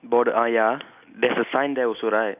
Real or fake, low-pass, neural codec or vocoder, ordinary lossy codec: real; 3.6 kHz; none; none